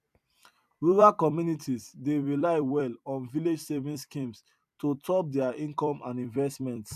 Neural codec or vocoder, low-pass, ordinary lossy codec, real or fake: vocoder, 44.1 kHz, 128 mel bands every 256 samples, BigVGAN v2; 14.4 kHz; none; fake